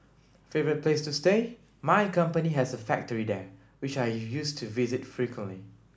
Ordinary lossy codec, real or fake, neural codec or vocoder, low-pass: none; real; none; none